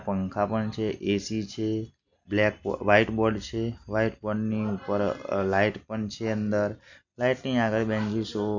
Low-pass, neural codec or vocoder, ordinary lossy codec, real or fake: 7.2 kHz; none; none; real